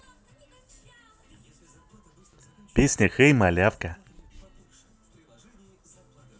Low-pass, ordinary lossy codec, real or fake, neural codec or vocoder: none; none; real; none